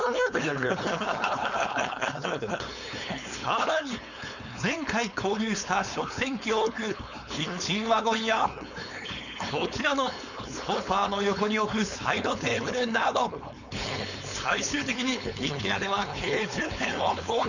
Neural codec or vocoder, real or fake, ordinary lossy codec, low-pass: codec, 16 kHz, 4.8 kbps, FACodec; fake; none; 7.2 kHz